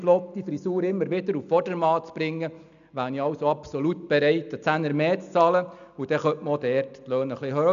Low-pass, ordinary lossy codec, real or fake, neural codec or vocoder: 7.2 kHz; none; real; none